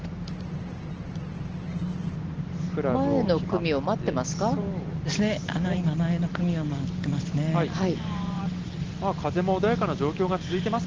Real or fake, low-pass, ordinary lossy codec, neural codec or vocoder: real; 7.2 kHz; Opus, 16 kbps; none